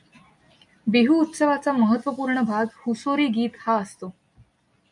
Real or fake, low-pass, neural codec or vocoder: real; 10.8 kHz; none